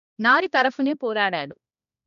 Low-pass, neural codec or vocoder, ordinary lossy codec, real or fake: 7.2 kHz; codec, 16 kHz, 1 kbps, X-Codec, HuBERT features, trained on balanced general audio; none; fake